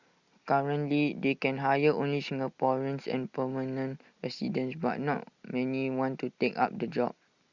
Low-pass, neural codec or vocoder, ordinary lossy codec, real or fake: 7.2 kHz; none; Opus, 64 kbps; real